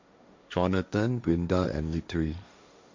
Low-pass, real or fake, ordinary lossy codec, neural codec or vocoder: 7.2 kHz; fake; MP3, 64 kbps; codec, 16 kHz, 1.1 kbps, Voila-Tokenizer